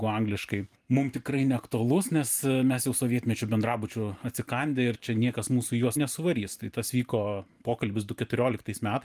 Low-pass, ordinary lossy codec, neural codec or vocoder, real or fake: 14.4 kHz; Opus, 32 kbps; vocoder, 44.1 kHz, 128 mel bands every 512 samples, BigVGAN v2; fake